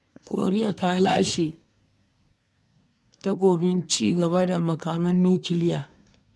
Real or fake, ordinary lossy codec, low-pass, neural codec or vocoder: fake; none; none; codec, 24 kHz, 1 kbps, SNAC